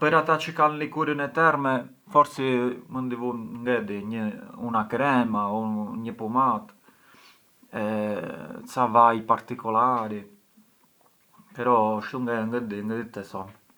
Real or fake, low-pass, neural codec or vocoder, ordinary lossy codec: real; none; none; none